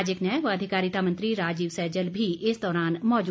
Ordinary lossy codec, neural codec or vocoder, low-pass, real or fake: none; none; none; real